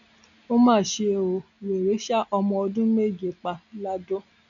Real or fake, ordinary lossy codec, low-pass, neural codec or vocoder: real; none; 7.2 kHz; none